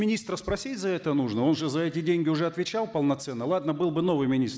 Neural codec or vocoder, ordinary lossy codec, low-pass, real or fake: none; none; none; real